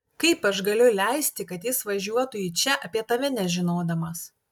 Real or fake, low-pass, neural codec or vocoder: real; 19.8 kHz; none